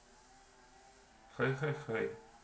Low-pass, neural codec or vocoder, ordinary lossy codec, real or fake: none; none; none; real